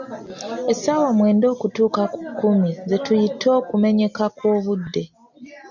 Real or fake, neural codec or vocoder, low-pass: real; none; 7.2 kHz